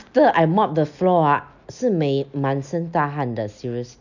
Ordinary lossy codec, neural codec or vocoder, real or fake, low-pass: none; none; real; 7.2 kHz